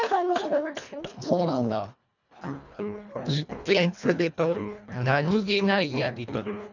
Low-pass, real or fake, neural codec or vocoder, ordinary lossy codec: 7.2 kHz; fake; codec, 24 kHz, 1.5 kbps, HILCodec; none